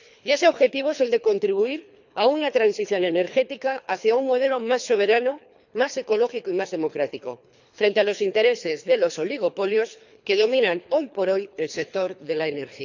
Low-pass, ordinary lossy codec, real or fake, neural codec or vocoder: 7.2 kHz; none; fake; codec, 24 kHz, 3 kbps, HILCodec